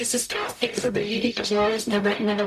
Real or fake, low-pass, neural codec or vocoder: fake; 14.4 kHz; codec, 44.1 kHz, 0.9 kbps, DAC